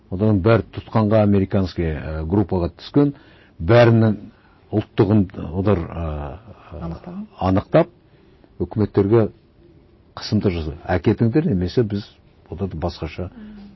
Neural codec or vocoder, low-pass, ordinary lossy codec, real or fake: none; 7.2 kHz; MP3, 24 kbps; real